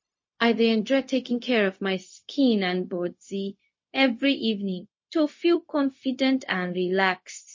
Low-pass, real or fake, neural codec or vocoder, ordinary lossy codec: 7.2 kHz; fake; codec, 16 kHz, 0.4 kbps, LongCat-Audio-Codec; MP3, 32 kbps